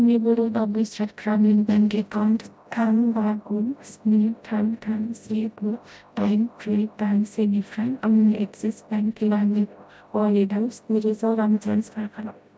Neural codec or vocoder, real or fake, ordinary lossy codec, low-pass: codec, 16 kHz, 0.5 kbps, FreqCodec, smaller model; fake; none; none